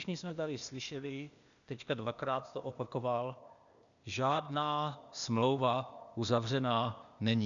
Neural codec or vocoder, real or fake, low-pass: codec, 16 kHz, 0.8 kbps, ZipCodec; fake; 7.2 kHz